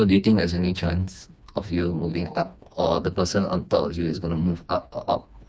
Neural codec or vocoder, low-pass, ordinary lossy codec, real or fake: codec, 16 kHz, 2 kbps, FreqCodec, smaller model; none; none; fake